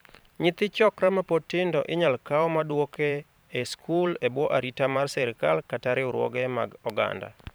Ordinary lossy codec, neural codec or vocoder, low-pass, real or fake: none; vocoder, 44.1 kHz, 128 mel bands every 512 samples, BigVGAN v2; none; fake